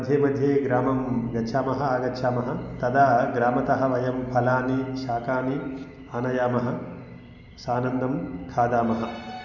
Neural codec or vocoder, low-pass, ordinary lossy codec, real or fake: none; 7.2 kHz; none; real